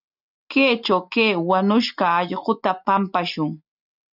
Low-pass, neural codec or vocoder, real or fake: 5.4 kHz; none; real